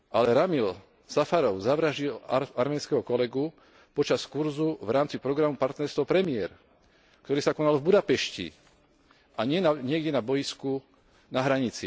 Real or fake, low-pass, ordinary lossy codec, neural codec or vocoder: real; none; none; none